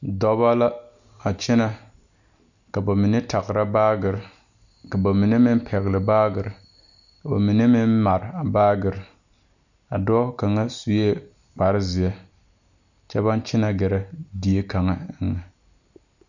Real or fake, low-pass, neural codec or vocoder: real; 7.2 kHz; none